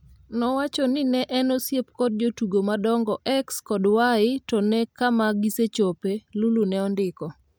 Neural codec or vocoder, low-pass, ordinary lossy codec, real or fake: none; none; none; real